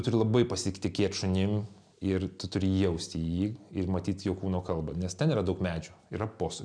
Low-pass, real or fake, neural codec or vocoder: 9.9 kHz; real; none